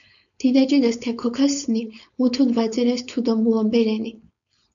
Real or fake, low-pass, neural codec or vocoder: fake; 7.2 kHz; codec, 16 kHz, 4.8 kbps, FACodec